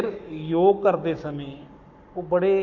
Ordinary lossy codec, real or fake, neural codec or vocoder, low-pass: none; fake; codec, 44.1 kHz, 7.8 kbps, Pupu-Codec; 7.2 kHz